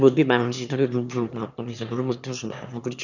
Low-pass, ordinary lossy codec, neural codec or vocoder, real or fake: 7.2 kHz; none; autoencoder, 22.05 kHz, a latent of 192 numbers a frame, VITS, trained on one speaker; fake